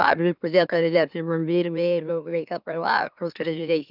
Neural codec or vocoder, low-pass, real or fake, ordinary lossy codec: autoencoder, 44.1 kHz, a latent of 192 numbers a frame, MeloTTS; 5.4 kHz; fake; none